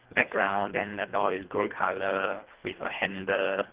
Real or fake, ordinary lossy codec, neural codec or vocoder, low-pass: fake; Opus, 16 kbps; codec, 24 kHz, 1.5 kbps, HILCodec; 3.6 kHz